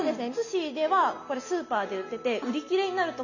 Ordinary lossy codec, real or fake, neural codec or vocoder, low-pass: none; real; none; 7.2 kHz